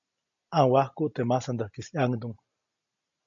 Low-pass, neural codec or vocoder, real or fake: 7.2 kHz; none; real